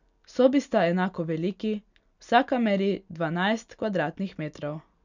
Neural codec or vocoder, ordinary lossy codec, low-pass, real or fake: none; none; 7.2 kHz; real